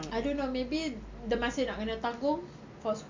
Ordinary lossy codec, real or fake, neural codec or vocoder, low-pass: MP3, 48 kbps; real; none; 7.2 kHz